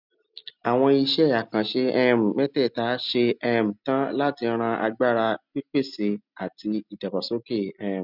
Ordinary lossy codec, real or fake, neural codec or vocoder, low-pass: none; real; none; 5.4 kHz